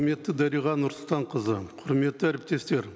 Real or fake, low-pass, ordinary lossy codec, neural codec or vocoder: real; none; none; none